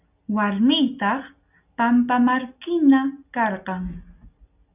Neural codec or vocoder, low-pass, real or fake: none; 3.6 kHz; real